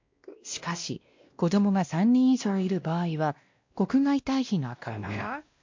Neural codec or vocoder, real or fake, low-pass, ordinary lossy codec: codec, 16 kHz, 1 kbps, X-Codec, WavLM features, trained on Multilingual LibriSpeech; fake; 7.2 kHz; MP3, 48 kbps